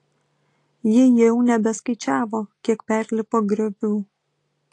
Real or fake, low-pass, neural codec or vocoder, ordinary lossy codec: real; 10.8 kHz; none; AAC, 48 kbps